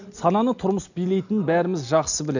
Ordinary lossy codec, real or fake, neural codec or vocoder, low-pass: none; real; none; 7.2 kHz